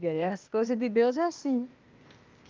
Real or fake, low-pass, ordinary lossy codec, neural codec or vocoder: fake; 7.2 kHz; Opus, 24 kbps; codec, 16 kHz, 0.8 kbps, ZipCodec